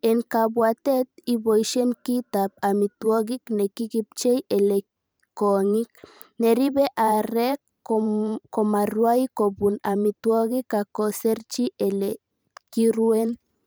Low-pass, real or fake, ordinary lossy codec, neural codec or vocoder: none; fake; none; vocoder, 44.1 kHz, 128 mel bands every 512 samples, BigVGAN v2